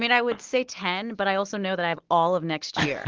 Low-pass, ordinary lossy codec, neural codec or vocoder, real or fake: 7.2 kHz; Opus, 16 kbps; none; real